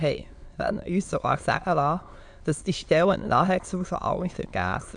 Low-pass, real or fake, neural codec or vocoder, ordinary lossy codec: 9.9 kHz; fake; autoencoder, 22.05 kHz, a latent of 192 numbers a frame, VITS, trained on many speakers; AAC, 64 kbps